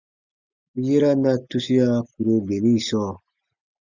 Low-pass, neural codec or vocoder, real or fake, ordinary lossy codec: 7.2 kHz; none; real; Opus, 64 kbps